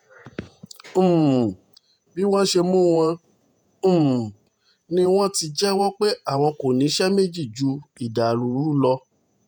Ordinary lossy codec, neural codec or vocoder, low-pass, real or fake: none; vocoder, 48 kHz, 128 mel bands, Vocos; none; fake